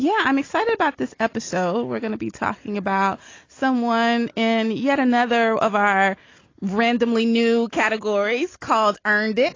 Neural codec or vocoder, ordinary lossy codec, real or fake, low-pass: none; AAC, 32 kbps; real; 7.2 kHz